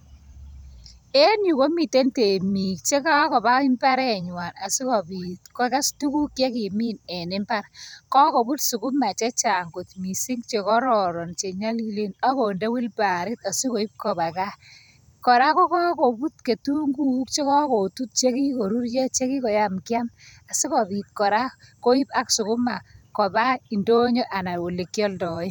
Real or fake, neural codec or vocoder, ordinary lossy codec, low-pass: fake; vocoder, 44.1 kHz, 128 mel bands every 512 samples, BigVGAN v2; none; none